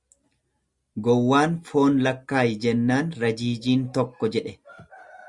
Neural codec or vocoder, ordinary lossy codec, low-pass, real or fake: none; Opus, 64 kbps; 10.8 kHz; real